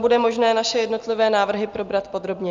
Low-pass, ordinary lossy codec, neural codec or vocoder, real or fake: 7.2 kHz; Opus, 32 kbps; none; real